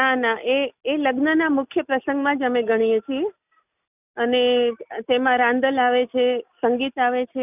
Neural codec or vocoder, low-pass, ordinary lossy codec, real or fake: none; 3.6 kHz; none; real